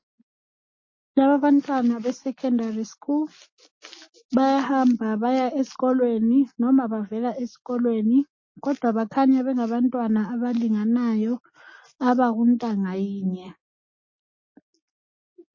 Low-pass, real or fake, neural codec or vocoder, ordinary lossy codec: 7.2 kHz; real; none; MP3, 32 kbps